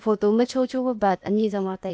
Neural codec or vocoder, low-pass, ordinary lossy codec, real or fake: codec, 16 kHz, 0.8 kbps, ZipCodec; none; none; fake